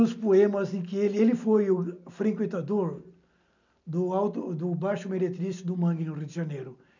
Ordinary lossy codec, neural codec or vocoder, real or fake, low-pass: none; none; real; 7.2 kHz